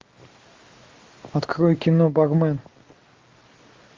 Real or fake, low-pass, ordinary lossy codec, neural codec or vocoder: real; 7.2 kHz; Opus, 32 kbps; none